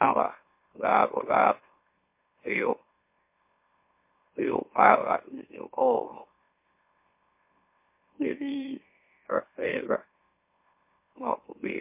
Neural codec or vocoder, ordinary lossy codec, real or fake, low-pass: autoencoder, 44.1 kHz, a latent of 192 numbers a frame, MeloTTS; MP3, 24 kbps; fake; 3.6 kHz